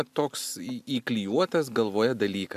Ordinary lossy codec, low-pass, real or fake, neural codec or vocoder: MP3, 96 kbps; 14.4 kHz; real; none